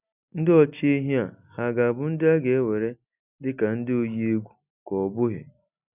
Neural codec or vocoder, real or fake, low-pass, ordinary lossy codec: none; real; 3.6 kHz; none